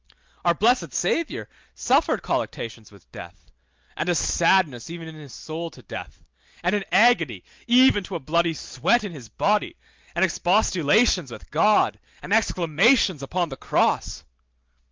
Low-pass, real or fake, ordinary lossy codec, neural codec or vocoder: 7.2 kHz; real; Opus, 24 kbps; none